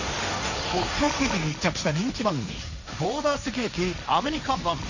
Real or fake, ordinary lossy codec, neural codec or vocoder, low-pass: fake; none; codec, 16 kHz, 1.1 kbps, Voila-Tokenizer; 7.2 kHz